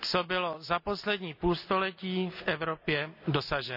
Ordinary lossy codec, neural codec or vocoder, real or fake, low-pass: none; none; real; 5.4 kHz